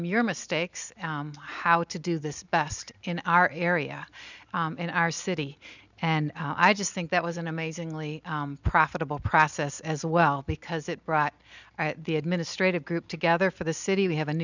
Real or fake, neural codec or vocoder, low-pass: real; none; 7.2 kHz